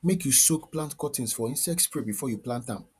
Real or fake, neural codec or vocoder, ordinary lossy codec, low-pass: fake; vocoder, 48 kHz, 128 mel bands, Vocos; none; 14.4 kHz